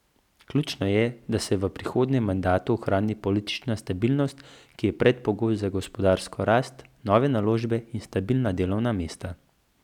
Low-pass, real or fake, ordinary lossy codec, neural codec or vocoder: 19.8 kHz; real; none; none